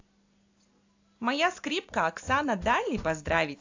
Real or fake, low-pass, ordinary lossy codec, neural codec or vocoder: real; 7.2 kHz; AAC, 48 kbps; none